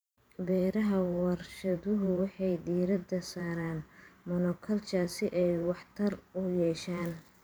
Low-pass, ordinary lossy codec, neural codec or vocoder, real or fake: none; none; vocoder, 44.1 kHz, 128 mel bands every 512 samples, BigVGAN v2; fake